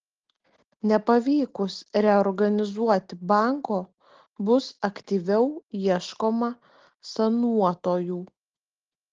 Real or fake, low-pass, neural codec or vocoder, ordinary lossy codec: real; 7.2 kHz; none; Opus, 16 kbps